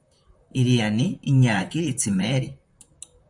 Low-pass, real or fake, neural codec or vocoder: 10.8 kHz; fake; vocoder, 44.1 kHz, 128 mel bands, Pupu-Vocoder